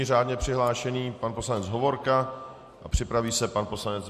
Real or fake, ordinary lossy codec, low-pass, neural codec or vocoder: real; MP3, 64 kbps; 14.4 kHz; none